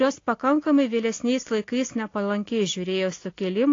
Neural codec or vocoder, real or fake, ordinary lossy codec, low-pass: none; real; AAC, 32 kbps; 7.2 kHz